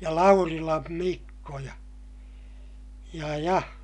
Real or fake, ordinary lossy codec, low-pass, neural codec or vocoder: real; none; 10.8 kHz; none